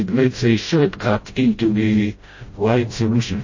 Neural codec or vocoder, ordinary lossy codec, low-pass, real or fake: codec, 16 kHz, 0.5 kbps, FreqCodec, smaller model; MP3, 32 kbps; 7.2 kHz; fake